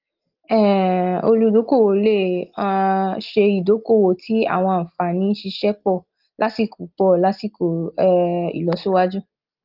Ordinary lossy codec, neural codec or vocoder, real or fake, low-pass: Opus, 32 kbps; none; real; 5.4 kHz